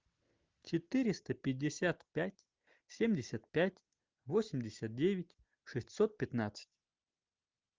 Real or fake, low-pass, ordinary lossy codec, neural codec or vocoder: real; 7.2 kHz; Opus, 24 kbps; none